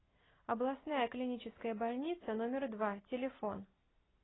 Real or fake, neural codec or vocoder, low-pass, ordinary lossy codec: real; none; 7.2 kHz; AAC, 16 kbps